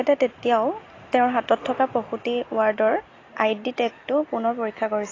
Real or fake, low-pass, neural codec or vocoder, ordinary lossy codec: real; 7.2 kHz; none; AAC, 32 kbps